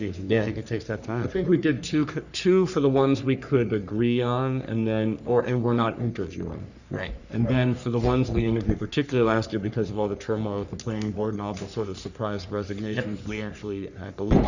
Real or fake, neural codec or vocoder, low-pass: fake; codec, 44.1 kHz, 3.4 kbps, Pupu-Codec; 7.2 kHz